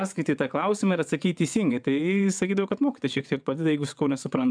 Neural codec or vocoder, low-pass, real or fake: vocoder, 22.05 kHz, 80 mel bands, WaveNeXt; 9.9 kHz; fake